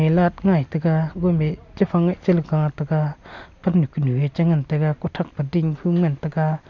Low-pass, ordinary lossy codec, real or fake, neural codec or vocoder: 7.2 kHz; none; real; none